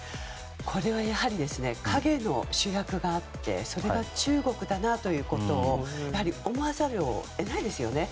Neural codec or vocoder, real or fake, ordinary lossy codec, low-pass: none; real; none; none